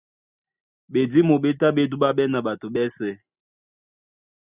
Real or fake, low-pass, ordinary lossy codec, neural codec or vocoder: real; 3.6 kHz; Opus, 64 kbps; none